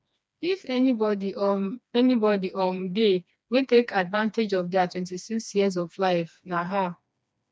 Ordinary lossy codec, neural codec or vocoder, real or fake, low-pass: none; codec, 16 kHz, 2 kbps, FreqCodec, smaller model; fake; none